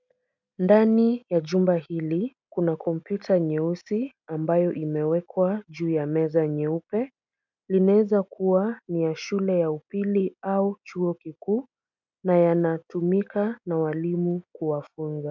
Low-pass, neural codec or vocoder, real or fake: 7.2 kHz; none; real